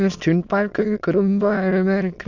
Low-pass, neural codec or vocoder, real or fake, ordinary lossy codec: 7.2 kHz; autoencoder, 22.05 kHz, a latent of 192 numbers a frame, VITS, trained on many speakers; fake; none